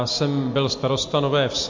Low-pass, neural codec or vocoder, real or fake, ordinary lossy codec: 7.2 kHz; none; real; MP3, 48 kbps